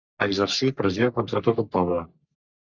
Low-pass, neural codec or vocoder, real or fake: 7.2 kHz; codec, 44.1 kHz, 3.4 kbps, Pupu-Codec; fake